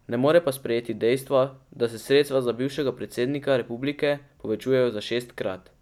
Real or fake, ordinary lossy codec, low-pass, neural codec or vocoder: real; none; 19.8 kHz; none